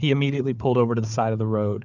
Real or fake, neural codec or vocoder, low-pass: fake; codec, 16 kHz, 4 kbps, FunCodec, trained on Chinese and English, 50 frames a second; 7.2 kHz